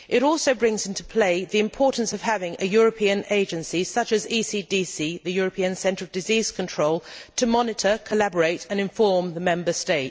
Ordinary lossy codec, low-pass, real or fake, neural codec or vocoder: none; none; real; none